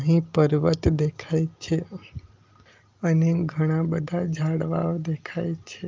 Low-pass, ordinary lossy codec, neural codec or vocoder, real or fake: 7.2 kHz; Opus, 32 kbps; none; real